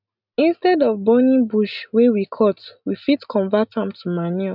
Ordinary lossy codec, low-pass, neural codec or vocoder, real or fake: none; 5.4 kHz; none; real